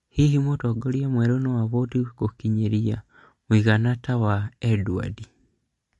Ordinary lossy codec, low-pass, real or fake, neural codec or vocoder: MP3, 48 kbps; 14.4 kHz; real; none